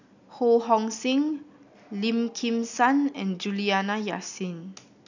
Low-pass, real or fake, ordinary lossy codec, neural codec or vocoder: 7.2 kHz; real; none; none